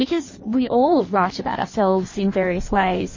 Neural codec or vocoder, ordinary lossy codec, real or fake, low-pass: codec, 16 kHz in and 24 kHz out, 1.1 kbps, FireRedTTS-2 codec; MP3, 32 kbps; fake; 7.2 kHz